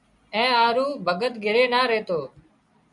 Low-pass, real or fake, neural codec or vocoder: 10.8 kHz; real; none